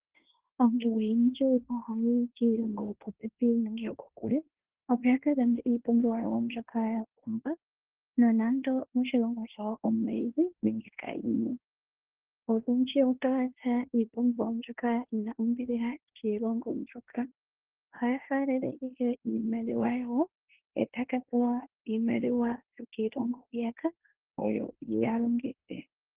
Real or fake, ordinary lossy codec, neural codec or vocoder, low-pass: fake; Opus, 16 kbps; codec, 16 kHz in and 24 kHz out, 0.9 kbps, LongCat-Audio-Codec, fine tuned four codebook decoder; 3.6 kHz